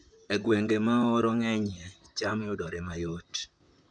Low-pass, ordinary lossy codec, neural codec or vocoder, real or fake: 9.9 kHz; none; vocoder, 44.1 kHz, 128 mel bands, Pupu-Vocoder; fake